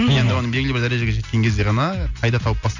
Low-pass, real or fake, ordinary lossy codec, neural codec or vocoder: 7.2 kHz; real; none; none